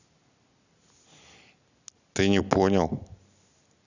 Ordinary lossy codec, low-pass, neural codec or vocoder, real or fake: none; 7.2 kHz; none; real